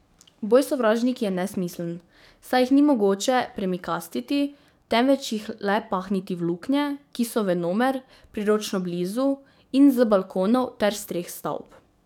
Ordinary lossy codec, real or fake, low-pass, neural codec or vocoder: none; fake; 19.8 kHz; codec, 44.1 kHz, 7.8 kbps, DAC